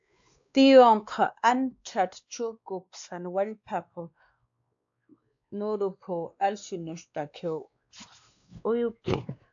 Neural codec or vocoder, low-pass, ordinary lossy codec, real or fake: codec, 16 kHz, 2 kbps, X-Codec, WavLM features, trained on Multilingual LibriSpeech; 7.2 kHz; AAC, 64 kbps; fake